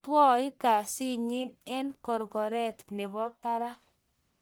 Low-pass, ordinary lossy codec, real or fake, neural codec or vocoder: none; none; fake; codec, 44.1 kHz, 1.7 kbps, Pupu-Codec